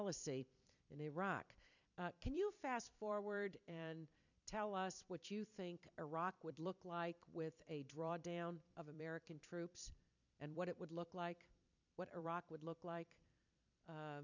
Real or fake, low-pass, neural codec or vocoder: fake; 7.2 kHz; vocoder, 44.1 kHz, 128 mel bands every 256 samples, BigVGAN v2